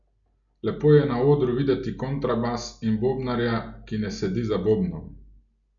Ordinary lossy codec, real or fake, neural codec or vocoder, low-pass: none; real; none; 7.2 kHz